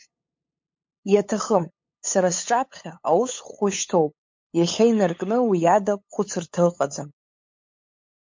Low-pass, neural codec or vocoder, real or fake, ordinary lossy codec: 7.2 kHz; codec, 16 kHz, 8 kbps, FunCodec, trained on LibriTTS, 25 frames a second; fake; MP3, 48 kbps